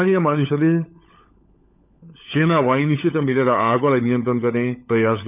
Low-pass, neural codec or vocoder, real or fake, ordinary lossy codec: 3.6 kHz; codec, 16 kHz, 16 kbps, FunCodec, trained on LibriTTS, 50 frames a second; fake; none